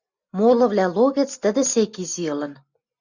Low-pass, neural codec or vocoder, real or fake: 7.2 kHz; none; real